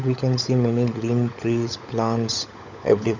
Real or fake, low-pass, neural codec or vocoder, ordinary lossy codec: fake; 7.2 kHz; codec, 16 kHz, 16 kbps, FunCodec, trained on LibriTTS, 50 frames a second; none